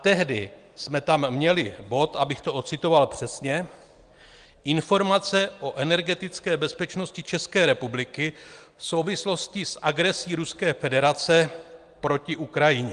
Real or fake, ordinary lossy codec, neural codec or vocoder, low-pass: real; Opus, 24 kbps; none; 9.9 kHz